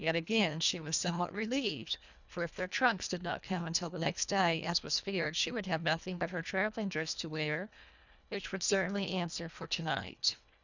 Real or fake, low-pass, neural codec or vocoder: fake; 7.2 kHz; codec, 24 kHz, 1.5 kbps, HILCodec